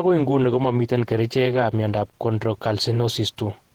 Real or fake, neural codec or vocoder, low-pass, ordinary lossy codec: fake; vocoder, 48 kHz, 128 mel bands, Vocos; 19.8 kHz; Opus, 16 kbps